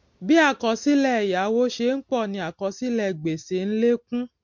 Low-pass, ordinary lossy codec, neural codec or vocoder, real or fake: 7.2 kHz; MP3, 48 kbps; none; real